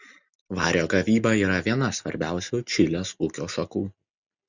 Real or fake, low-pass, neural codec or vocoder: real; 7.2 kHz; none